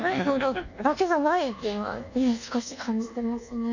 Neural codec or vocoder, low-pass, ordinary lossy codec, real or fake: codec, 24 kHz, 1.2 kbps, DualCodec; 7.2 kHz; none; fake